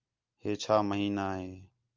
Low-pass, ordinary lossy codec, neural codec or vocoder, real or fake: 7.2 kHz; Opus, 24 kbps; none; real